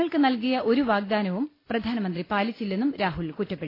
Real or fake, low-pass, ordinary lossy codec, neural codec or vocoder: real; 5.4 kHz; AAC, 24 kbps; none